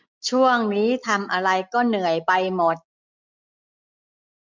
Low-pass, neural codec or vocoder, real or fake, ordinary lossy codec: 7.2 kHz; none; real; MP3, 64 kbps